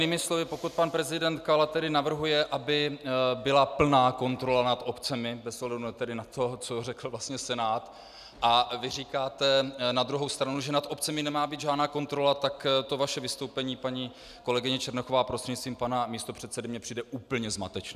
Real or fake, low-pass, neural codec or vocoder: real; 14.4 kHz; none